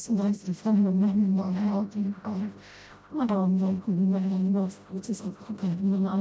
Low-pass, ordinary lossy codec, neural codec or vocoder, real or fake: none; none; codec, 16 kHz, 0.5 kbps, FreqCodec, smaller model; fake